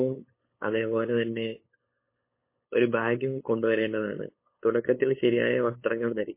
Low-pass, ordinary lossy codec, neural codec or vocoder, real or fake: 3.6 kHz; MP3, 24 kbps; codec, 16 kHz, 8 kbps, FunCodec, trained on LibriTTS, 25 frames a second; fake